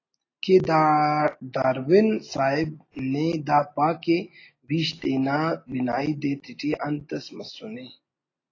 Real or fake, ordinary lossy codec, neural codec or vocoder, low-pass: real; AAC, 32 kbps; none; 7.2 kHz